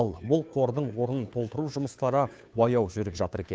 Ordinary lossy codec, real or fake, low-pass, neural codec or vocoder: none; fake; none; codec, 16 kHz, 2 kbps, FunCodec, trained on Chinese and English, 25 frames a second